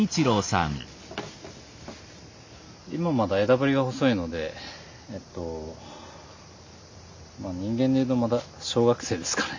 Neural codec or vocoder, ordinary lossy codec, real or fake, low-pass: none; MP3, 48 kbps; real; 7.2 kHz